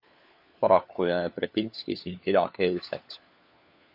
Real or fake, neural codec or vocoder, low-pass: fake; codec, 16 kHz, 4 kbps, FunCodec, trained on LibriTTS, 50 frames a second; 5.4 kHz